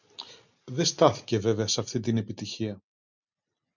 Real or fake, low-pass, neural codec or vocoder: real; 7.2 kHz; none